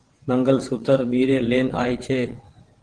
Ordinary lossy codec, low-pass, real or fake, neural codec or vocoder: Opus, 16 kbps; 9.9 kHz; fake; vocoder, 22.05 kHz, 80 mel bands, WaveNeXt